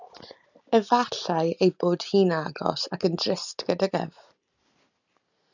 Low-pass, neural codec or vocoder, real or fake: 7.2 kHz; none; real